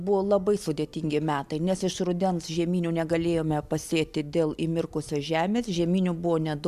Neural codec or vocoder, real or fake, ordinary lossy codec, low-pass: none; real; MP3, 96 kbps; 14.4 kHz